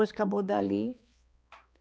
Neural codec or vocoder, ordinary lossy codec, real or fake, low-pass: codec, 16 kHz, 2 kbps, X-Codec, HuBERT features, trained on balanced general audio; none; fake; none